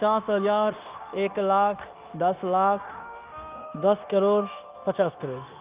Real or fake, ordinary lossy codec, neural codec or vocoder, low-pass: fake; Opus, 32 kbps; codec, 16 kHz, 0.9 kbps, LongCat-Audio-Codec; 3.6 kHz